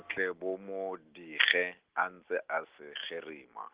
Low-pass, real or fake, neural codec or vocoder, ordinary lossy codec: 3.6 kHz; real; none; Opus, 16 kbps